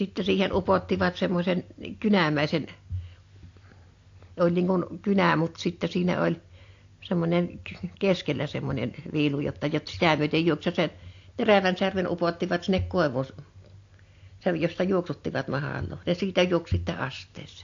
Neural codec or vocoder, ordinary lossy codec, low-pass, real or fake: none; AAC, 48 kbps; 7.2 kHz; real